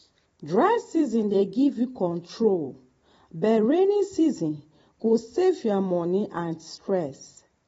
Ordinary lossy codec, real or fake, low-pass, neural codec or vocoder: AAC, 24 kbps; fake; 19.8 kHz; vocoder, 44.1 kHz, 128 mel bands every 256 samples, BigVGAN v2